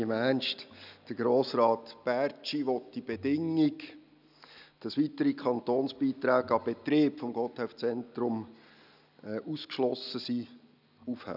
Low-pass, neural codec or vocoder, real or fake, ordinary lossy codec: 5.4 kHz; none; real; none